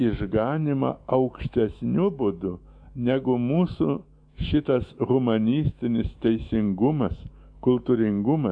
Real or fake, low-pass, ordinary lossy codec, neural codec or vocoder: fake; 9.9 kHz; AAC, 64 kbps; autoencoder, 48 kHz, 128 numbers a frame, DAC-VAE, trained on Japanese speech